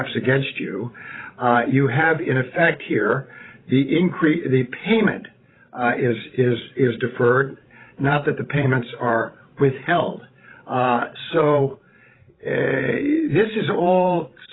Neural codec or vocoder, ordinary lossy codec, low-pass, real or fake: codec, 16 kHz, 16 kbps, FreqCodec, larger model; AAC, 16 kbps; 7.2 kHz; fake